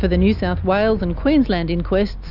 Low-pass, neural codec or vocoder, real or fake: 5.4 kHz; none; real